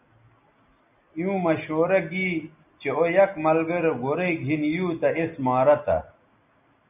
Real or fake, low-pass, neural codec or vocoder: real; 3.6 kHz; none